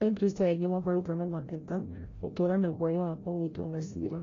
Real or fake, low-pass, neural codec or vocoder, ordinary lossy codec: fake; 7.2 kHz; codec, 16 kHz, 0.5 kbps, FreqCodec, larger model; AAC, 32 kbps